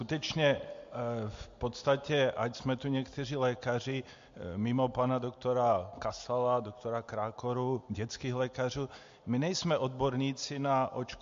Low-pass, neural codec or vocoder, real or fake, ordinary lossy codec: 7.2 kHz; none; real; MP3, 48 kbps